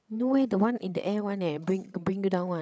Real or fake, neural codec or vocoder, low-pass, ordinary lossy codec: fake; codec, 16 kHz, 8 kbps, FreqCodec, larger model; none; none